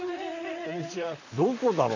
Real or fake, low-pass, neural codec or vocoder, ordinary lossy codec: fake; 7.2 kHz; vocoder, 44.1 kHz, 128 mel bands, Pupu-Vocoder; none